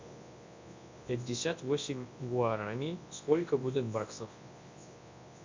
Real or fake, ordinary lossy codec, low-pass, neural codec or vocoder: fake; MP3, 64 kbps; 7.2 kHz; codec, 24 kHz, 0.9 kbps, WavTokenizer, large speech release